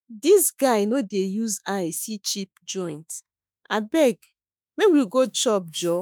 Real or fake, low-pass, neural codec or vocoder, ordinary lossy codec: fake; none; autoencoder, 48 kHz, 32 numbers a frame, DAC-VAE, trained on Japanese speech; none